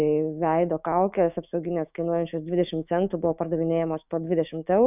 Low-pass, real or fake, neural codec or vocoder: 3.6 kHz; fake; autoencoder, 48 kHz, 128 numbers a frame, DAC-VAE, trained on Japanese speech